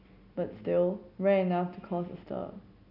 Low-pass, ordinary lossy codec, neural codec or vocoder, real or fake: 5.4 kHz; none; none; real